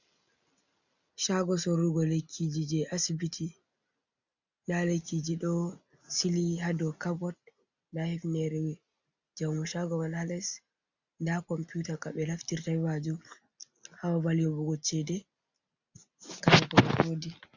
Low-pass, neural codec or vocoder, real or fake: 7.2 kHz; none; real